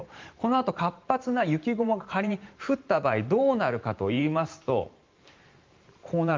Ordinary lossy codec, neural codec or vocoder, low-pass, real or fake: Opus, 24 kbps; vocoder, 44.1 kHz, 128 mel bands every 512 samples, BigVGAN v2; 7.2 kHz; fake